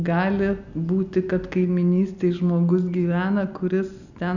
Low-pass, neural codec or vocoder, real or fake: 7.2 kHz; none; real